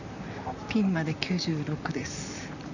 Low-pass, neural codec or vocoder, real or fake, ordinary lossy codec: 7.2 kHz; vocoder, 44.1 kHz, 128 mel bands, Pupu-Vocoder; fake; none